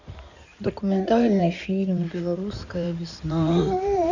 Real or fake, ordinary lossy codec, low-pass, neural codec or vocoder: fake; none; 7.2 kHz; codec, 16 kHz in and 24 kHz out, 2.2 kbps, FireRedTTS-2 codec